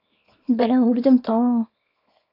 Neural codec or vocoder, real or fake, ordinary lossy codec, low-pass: codec, 24 kHz, 0.9 kbps, WavTokenizer, small release; fake; AAC, 32 kbps; 5.4 kHz